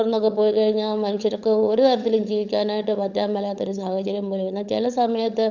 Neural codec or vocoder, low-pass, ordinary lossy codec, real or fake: codec, 16 kHz, 16 kbps, FunCodec, trained on LibriTTS, 50 frames a second; 7.2 kHz; none; fake